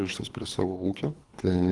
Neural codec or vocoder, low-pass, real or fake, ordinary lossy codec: codec, 24 kHz, 3 kbps, HILCodec; 10.8 kHz; fake; Opus, 24 kbps